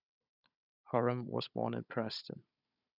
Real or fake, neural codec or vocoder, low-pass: fake; codec, 16 kHz, 16 kbps, FunCodec, trained on Chinese and English, 50 frames a second; 5.4 kHz